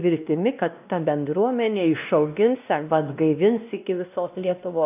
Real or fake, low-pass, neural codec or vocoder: fake; 3.6 kHz; codec, 16 kHz, 1 kbps, X-Codec, WavLM features, trained on Multilingual LibriSpeech